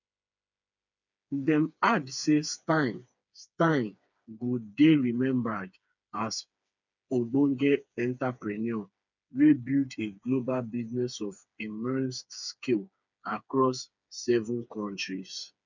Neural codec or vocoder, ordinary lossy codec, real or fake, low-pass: codec, 16 kHz, 4 kbps, FreqCodec, smaller model; none; fake; 7.2 kHz